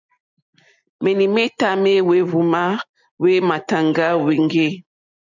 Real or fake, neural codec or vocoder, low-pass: real; none; 7.2 kHz